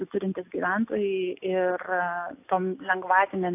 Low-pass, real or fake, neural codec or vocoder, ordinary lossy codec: 3.6 kHz; real; none; AAC, 24 kbps